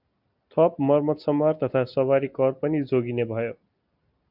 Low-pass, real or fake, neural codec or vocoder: 5.4 kHz; real; none